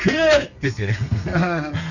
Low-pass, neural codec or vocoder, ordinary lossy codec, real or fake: 7.2 kHz; codec, 32 kHz, 1.9 kbps, SNAC; none; fake